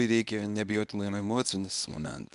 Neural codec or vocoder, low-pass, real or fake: codec, 24 kHz, 0.9 kbps, WavTokenizer, medium speech release version 1; 10.8 kHz; fake